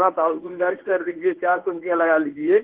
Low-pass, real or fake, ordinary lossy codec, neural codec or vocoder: 3.6 kHz; fake; Opus, 16 kbps; codec, 24 kHz, 0.9 kbps, WavTokenizer, medium speech release version 1